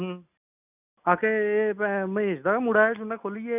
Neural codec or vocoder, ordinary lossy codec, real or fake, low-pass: none; none; real; 3.6 kHz